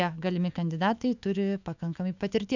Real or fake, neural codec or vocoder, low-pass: fake; autoencoder, 48 kHz, 128 numbers a frame, DAC-VAE, trained on Japanese speech; 7.2 kHz